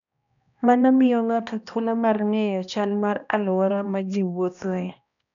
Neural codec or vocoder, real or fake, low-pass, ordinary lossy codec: codec, 16 kHz, 1 kbps, X-Codec, HuBERT features, trained on balanced general audio; fake; 7.2 kHz; none